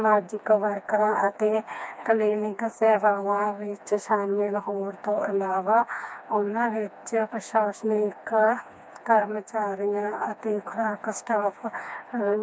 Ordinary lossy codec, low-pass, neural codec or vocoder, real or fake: none; none; codec, 16 kHz, 2 kbps, FreqCodec, smaller model; fake